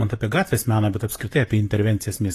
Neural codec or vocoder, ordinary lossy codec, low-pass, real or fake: vocoder, 44.1 kHz, 128 mel bands, Pupu-Vocoder; AAC, 48 kbps; 14.4 kHz; fake